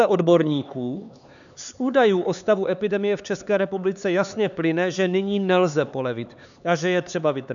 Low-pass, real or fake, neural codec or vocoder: 7.2 kHz; fake; codec, 16 kHz, 4 kbps, X-Codec, HuBERT features, trained on LibriSpeech